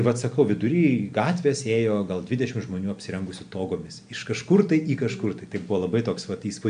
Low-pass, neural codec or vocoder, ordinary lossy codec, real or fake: 9.9 kHz; none; MP3, 64 kbps; real